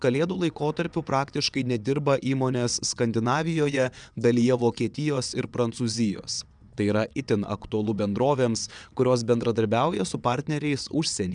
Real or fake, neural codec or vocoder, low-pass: fake; vocoder, 22.05 kHz, 80 mel bands, WaveNeXt; 9.9 kHz